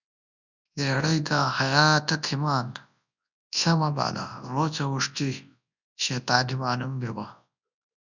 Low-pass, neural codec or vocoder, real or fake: 7.2 kHz; codec, 24 kHz, 0.9 kbps, WavTokenizer, large speech release; fake